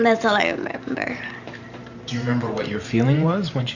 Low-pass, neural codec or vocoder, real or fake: 7.2 kHz; vocoder, 44.1 kHz, 128 mel bands every 512 samples, BigVGAN v2; fake